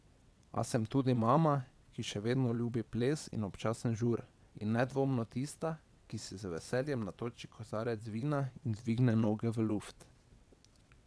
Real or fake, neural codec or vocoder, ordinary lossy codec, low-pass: fake; vocoder, 22.05 kHz, 80 mel bands, WaveNeXt; none; none